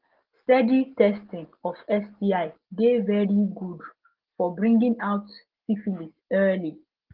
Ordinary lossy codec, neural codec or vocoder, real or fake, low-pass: Opus, 16 kbps; none; real; 5.4 kHz